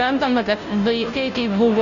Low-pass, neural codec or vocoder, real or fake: 7.2 kHz; codec, 16 kHz, 0.5 kbps, FunCodec, trained on Chinese and English, 25 frames a second; fake